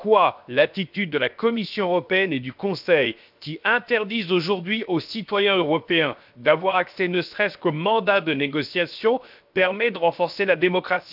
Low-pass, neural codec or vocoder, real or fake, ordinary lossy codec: 5.4 kHz; codec, 16 kHz, 0.7 kbps, FocalCodec; fake; none